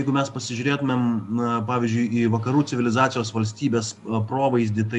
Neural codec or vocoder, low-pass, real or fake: none; 10.8 kHz; real